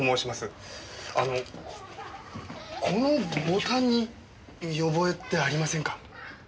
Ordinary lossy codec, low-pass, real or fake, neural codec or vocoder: none; none; real; none